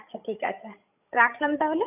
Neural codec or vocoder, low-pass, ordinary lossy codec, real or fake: codec, 16 kHz, 16 kbps, FreqCodec, larger model; 3.6 kHz; none; fake